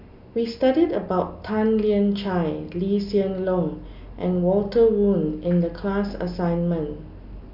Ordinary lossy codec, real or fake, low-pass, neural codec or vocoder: none; real; 5.4 kHz; none